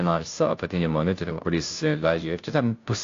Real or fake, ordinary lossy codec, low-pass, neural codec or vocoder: fake; AAC, 48 kbps; 7.2 kHz; codec, 16 kHz, 0.5 kbps, FunCodec, trained on Chinese and English, 25 frames a second